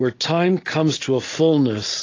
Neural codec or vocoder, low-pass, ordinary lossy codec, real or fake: none; 7.2 kHz; AAC, 32 kbps; real